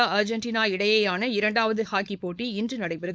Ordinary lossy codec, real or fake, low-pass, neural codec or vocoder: none; fake; none; codec, 16 kHz, 4.8 kbps, FACodec